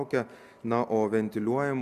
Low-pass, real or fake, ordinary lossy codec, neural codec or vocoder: 14.4 kHz; real; MP3, 96 kbps; none